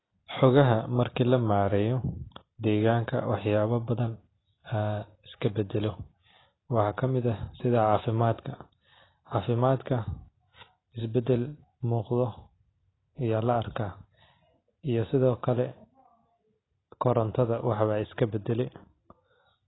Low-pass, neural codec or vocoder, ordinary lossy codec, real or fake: 7.2 kHz; none; AAC, 16 kbps; real